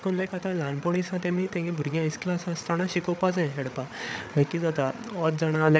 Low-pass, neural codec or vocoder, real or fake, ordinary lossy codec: none; codec, 16 kHz, 8 kbps, FreqCodec, larger model; fake; none